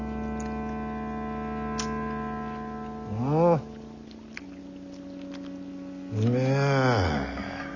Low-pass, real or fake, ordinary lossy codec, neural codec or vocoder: 7.2 kHz; real; none; none